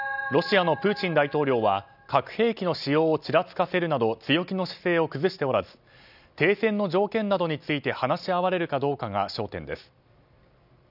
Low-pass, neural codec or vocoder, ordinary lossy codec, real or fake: 5.4 kHz; none; none; real